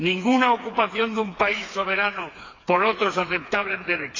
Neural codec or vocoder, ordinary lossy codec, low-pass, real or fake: codec, 16 kHz, 4 kbps, FreqCodec, smaller model; AAC, 32 kbps; 7.2 kHz; fake